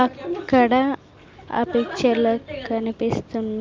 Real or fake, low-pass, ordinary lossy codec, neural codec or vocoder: real; 7.2 kHz; Opus, 24 kbps; none